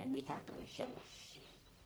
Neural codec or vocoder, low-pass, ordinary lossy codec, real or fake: codec, 44.1 kHz, 1.7 kbps, Pupu-Codec; none; none; fake